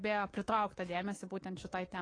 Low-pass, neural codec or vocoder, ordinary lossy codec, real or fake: 9.9 kHz; codec, 24 kHz, 3.1 kbps, DualCodec; AAC, 32 kbps; fake